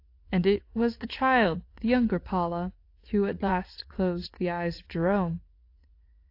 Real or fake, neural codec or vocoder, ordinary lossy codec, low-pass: fake; vocoder, 44.1 kHz, 80 mel bands, Vocos; AAC, 32 kbps; 5.4 kHz